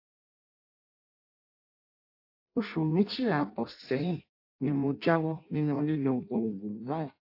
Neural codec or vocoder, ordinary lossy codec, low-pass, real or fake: codec, 16 kHz in and 24 kHz out, 0.6 kbps, FireRedTTS-2 codec; AAC, 32 kbps; 5.4 kHz; fake